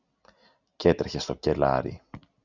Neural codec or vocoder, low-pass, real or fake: none; 7.2 kHz; real